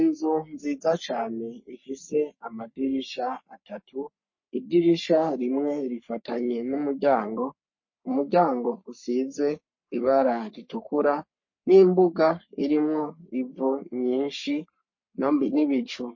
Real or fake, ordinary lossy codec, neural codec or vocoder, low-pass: fake; MP3, 32 kbps; codec, 44.1 kHz, 3.4 kbps, Pupu-Codec; 7.2 kHz